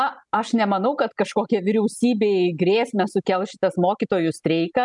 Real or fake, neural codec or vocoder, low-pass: real; none; 10.8 kHz